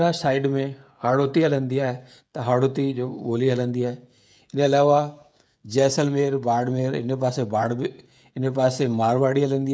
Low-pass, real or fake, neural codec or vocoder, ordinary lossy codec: none; fake; codec, 16 kHz, 16 kbps, FreqCodec, smaller model; none